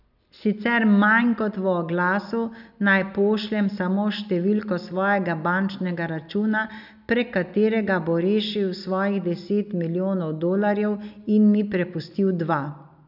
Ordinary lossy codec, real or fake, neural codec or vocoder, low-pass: none; real; none; 5.4 kHz